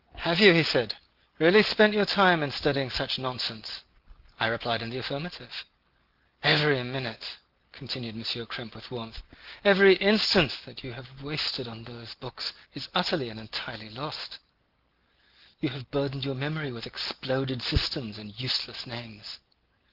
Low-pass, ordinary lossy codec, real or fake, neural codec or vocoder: 5.4 kHz; Opus, 16 kbps; real; none